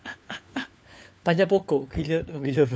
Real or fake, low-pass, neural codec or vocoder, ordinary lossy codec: fake; none; codec, 16 kHz, 4 kbps, FunCodec, trained on LibriTTS, 50 frames a second; none